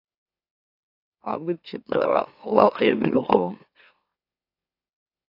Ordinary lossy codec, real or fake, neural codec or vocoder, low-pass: AAC, 32 kbps; fake; autoencoder, 44.1 kHz, a latent of 192 numbers a frame, MeloTTS; 5.4 kHz